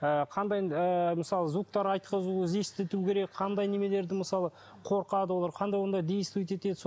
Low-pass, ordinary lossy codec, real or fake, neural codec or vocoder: none; none; real; none